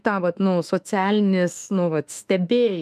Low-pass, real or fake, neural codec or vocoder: 14.4 kHz; fake; autoencoder, 48 kHz, 32 numbers a frame, DAC-VAE, trained on Japanese speech